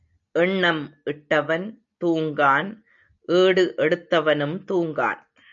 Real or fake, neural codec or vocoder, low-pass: real; none; 7.2 kHz